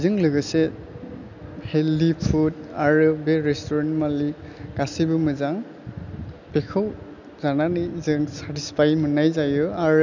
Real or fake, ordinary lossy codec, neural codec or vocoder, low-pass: real; none; none; 7.2 kHz